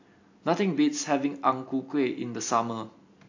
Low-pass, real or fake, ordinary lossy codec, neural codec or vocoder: 7.2 kHz; real; AAC, 48 kbps; none